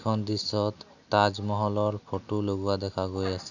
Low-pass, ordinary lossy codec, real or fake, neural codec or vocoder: 7.2 kHz; none; real; none